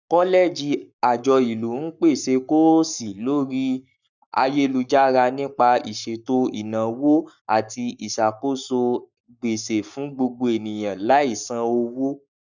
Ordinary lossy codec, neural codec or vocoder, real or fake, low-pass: none; codec, 44.1 kHz, 7.8 kbps, Pupu-Codec; fake; 7.2 kHz